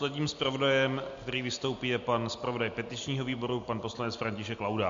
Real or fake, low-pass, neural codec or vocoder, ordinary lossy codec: real; 7.2 kHz; none; MP3, 48 kbps